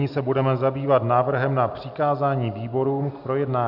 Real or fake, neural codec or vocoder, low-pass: real; none; 5.4 kHz